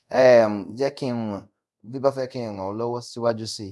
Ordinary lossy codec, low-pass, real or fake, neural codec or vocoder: none; none; fake; codec, 24 kHz, 0.5 kbps, DualCodec